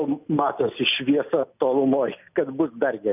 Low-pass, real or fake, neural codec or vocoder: 3.6 kHz; real; none